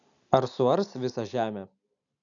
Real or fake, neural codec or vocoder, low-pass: real; none; 7.2 kHz